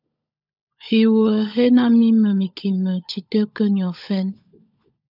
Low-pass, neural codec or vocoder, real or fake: 5.4 kHz; codec, 16 kHz, 16 kbps, FunCodec, trained on LibriTTS, 50 frames a second; fake